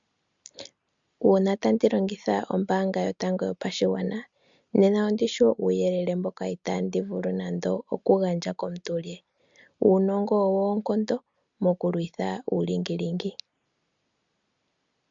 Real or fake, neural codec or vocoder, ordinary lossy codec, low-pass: real; none; MP3, 64 kbps; 7.2 kHz